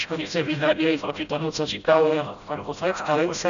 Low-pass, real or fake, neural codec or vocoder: 7.2 kHz; fake; codec, 16 kHz, 0.5 kbps, FreqCodec, smaller model